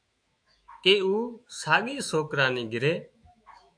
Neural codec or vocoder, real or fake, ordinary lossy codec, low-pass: autoencoder, 48 kHz, 128 numbers a frame, DAC-VAE, trained on Japanese speech; fake; MP3, 64 kbps; 9.9 kHz